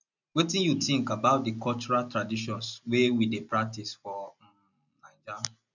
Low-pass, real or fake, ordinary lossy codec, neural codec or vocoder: 7.2 kHz; real; none; none